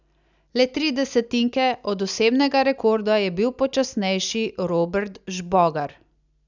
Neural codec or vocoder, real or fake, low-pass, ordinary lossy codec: none; real; 7.2 kHz; none